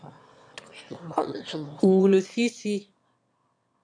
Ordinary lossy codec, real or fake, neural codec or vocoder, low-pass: AAC, 64 kbps; fake; autoencoder, 22.05 kHz, a latent of 192 numbers a frame, VITS, trained on one speaker; 9.9 kHz